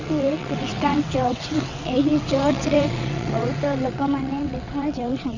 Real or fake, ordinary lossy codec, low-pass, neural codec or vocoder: fake; none; 7.2 kHz; vocoder, 22.05 kHz, 80 mel bands, WaveNeXt